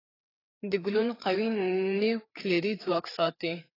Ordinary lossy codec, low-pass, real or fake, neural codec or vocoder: AAC, 24 kbps; 5.4 kHz; fake; codec, 16 kHz, 4 kbps, FreqCodec, larger model